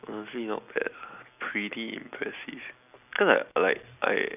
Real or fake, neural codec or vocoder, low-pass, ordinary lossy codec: real; none; 3.6 kHz; none